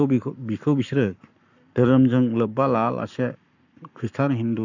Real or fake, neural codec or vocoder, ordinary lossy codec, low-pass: fake; codec, 44.1 kHz, 7.8 kbps, Pupu-Codec; none; 7.2 kHz